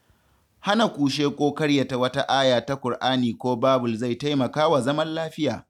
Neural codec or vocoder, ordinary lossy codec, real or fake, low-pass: none; none; real; 19.8 kHz